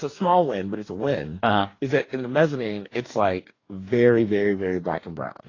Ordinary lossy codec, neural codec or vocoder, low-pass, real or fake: AAC, 32 kbps; codec, 44.1 kHz, 2.6 kbps, DAC; 7.2 kHz; fake